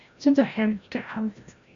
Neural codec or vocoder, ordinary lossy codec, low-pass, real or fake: codec, 16 kHz, 0.5 kbps, FreqCodec, larger model; Opus, 64 kbps; 7.2 kHz; fake